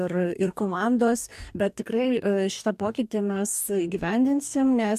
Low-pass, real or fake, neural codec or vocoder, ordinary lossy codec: 14.4 kHz; fake; codec, 44.1 kHz, 2.6 kbps, DAC; AAC, 96 kbps